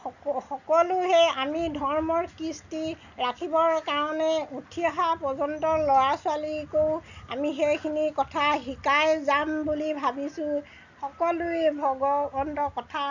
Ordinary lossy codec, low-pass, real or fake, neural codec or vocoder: none; 7.2 kHz; real; none